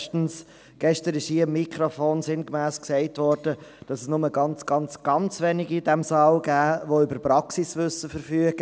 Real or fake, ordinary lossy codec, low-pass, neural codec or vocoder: real; none; none; none